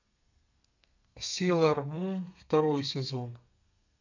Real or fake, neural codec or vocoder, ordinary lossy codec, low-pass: fake; codec, 32 kHz, 1.9 kbps, SNAC; none; 7.2 kHz